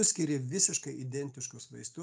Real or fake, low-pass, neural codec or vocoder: real; 9.9 kHz; none